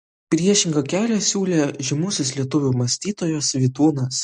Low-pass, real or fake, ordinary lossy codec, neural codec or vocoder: 14.4 kHz; real; MP3, 48 kbps; none